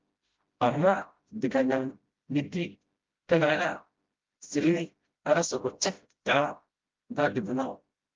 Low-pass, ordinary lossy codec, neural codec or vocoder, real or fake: 7.2 kHz; Opus, 32 kbps; codec, 16 kHz, 0.5 kbps, FreqCodec, smaller model; fake